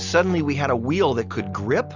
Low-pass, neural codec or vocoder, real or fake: 7.2 kHz; none; real